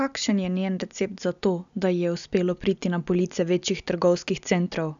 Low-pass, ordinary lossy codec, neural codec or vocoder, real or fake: 7.2 kHz; none; none; real